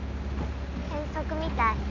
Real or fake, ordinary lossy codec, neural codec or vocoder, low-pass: real; none; none; 7.2 kHz